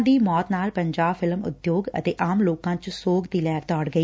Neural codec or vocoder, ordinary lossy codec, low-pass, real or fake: none; none; none; real